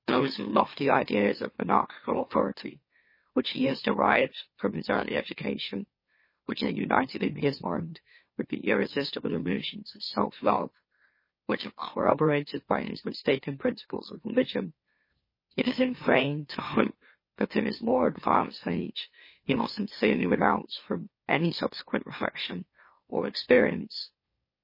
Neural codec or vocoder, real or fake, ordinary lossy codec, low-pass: autoencoder, 44.1 kHz, a latent of 192 numbers a frame, MeloTTS; fake; MP3, 24 kbps; 5.4 kHz